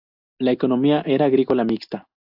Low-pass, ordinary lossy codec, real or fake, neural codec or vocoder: 5.4 kHz; AAC, 48 kbps; real; none